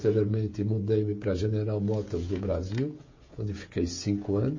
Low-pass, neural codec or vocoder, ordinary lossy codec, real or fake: 7.2 kHz; codec, 16 kHz, 16 kbps, FreqCodec, smaller model; MP3, 32 kbps; fake